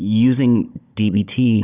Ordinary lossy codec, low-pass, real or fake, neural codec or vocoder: Opus, 64 kbps; 3.6 kHz; fake; codec, 16 kHz, 16 kbps, FunCodec, trained on Chinese and English, 50 frames a second